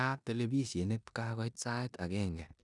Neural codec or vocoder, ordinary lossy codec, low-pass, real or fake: codec, 16 kHz in and 24 kHz out, 0.9 kbps, LongCat-Audio-Codec, four codebook decoder; none; 10.8 kHz; fake